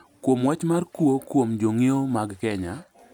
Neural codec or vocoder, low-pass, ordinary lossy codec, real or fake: none; 19.8 kHz; none; real